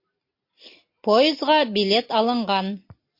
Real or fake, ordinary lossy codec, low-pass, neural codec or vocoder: real; MP3, 32 kbps; 5.4 kHz; none